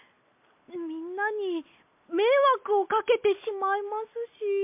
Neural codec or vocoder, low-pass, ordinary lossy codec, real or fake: none; 3.6 kHz; MP3, 32 kbps; real